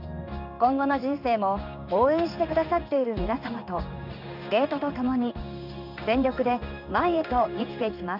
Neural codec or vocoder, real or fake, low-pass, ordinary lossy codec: codec, 16 kHz in and 24 kHz out, 1 kbps, XY-Tokenizer; fake; 5.4 kHz; none